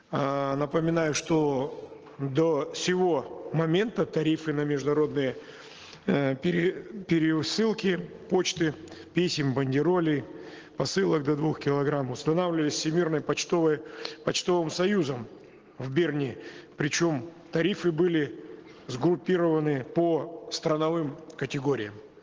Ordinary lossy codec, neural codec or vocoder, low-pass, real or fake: Opus, 16 kbps; none; 7.2 kHz; real